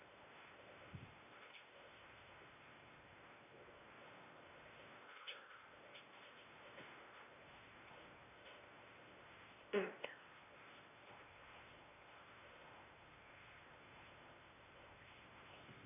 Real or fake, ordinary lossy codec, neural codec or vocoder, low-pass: fake; none; codec, 16 kHz, 1 kbps, X-Codec, WavLM features, trained on Multilingual LibriSpeech; 3.6 kHz